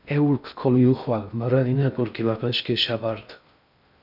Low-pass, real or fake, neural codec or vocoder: 5.4 kHz; fake; codec, 16 kHz in and 24 kHz out, 0.6 kbps, FocalCodec, streaming, 2048 codes